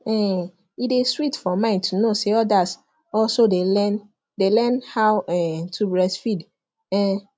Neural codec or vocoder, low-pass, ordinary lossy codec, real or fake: none; none; none; real